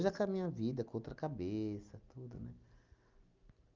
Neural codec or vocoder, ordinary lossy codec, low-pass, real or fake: none; Opus, 24 kbps; 7.2 kHz; real